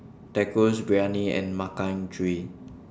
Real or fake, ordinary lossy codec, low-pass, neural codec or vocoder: real; none; none; none